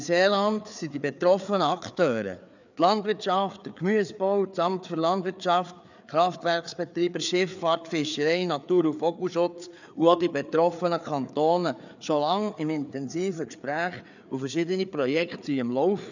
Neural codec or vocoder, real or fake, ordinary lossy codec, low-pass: codec, 16 kHz, 4 kbps, FreqCodec, larger model; fake; none; 7.2 kHz